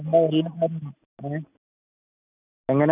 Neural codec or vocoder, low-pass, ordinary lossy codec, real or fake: none; 3.6 kHz; none; real